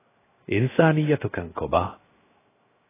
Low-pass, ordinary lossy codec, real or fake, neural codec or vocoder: 3.6 kHz; AAC, 16 kbps; fake; codec, 16 kHz, 0.7 kbps, FocalCodec